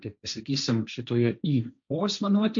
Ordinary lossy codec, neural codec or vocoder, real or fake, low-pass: MP3, 64 kbps; codec, 16 kHz, 1.1 kbps, Voila-Tokenizer; fake; 7.2 kHz